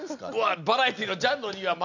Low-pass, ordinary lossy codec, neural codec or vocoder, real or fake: 7.2 kHz; none; none; real